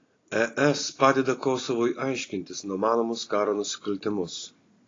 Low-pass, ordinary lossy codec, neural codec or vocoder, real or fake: 7.2 kHz; AAC, 32 kbps; none; real